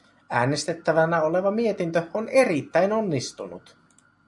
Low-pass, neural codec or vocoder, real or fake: 10.8 kHz; none; real